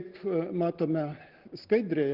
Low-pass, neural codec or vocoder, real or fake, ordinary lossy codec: 5.4 kHz; none; real; Opus, 16 kbps